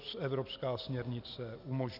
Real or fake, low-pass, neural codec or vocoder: real; 5.4 kHz; none